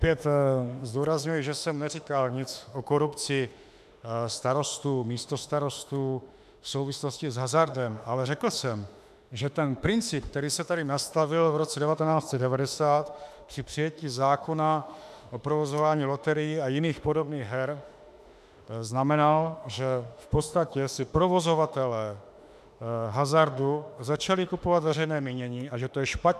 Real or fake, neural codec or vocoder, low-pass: fake; autoencoder, 48 kHz, 32 numbers a frame, DAC-VAE, trained on Japanese speech; 14.4 kHz